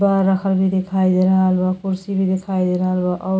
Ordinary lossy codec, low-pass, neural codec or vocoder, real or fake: none; none; none; real